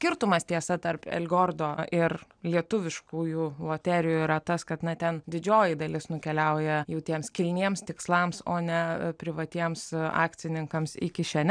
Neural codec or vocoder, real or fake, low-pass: none; real; 9.9 kHz